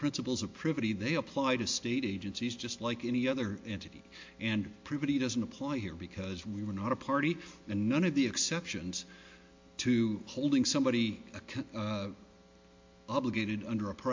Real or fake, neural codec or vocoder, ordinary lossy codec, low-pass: real; none; MP3, 48 kbps; 7.2 kHz